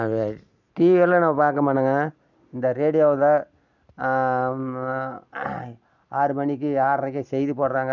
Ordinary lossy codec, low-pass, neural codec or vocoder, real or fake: none; 7.2 kHz; none; real